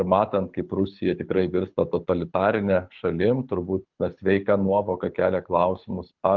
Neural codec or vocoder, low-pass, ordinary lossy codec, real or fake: codec, 24 kHz, 6 kbps, HILCodec; 7.2 kHz; Opus, 32 kbps; fake